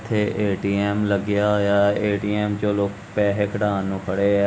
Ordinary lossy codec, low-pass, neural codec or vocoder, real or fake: none; none; none; real